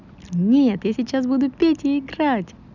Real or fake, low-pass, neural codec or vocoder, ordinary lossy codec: real; 7.2 kHz; none; none